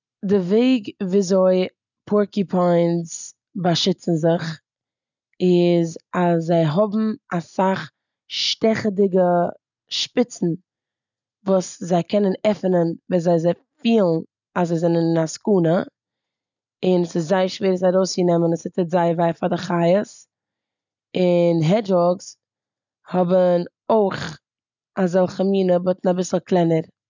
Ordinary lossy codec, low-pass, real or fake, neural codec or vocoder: none; 7.2 kHz; real; none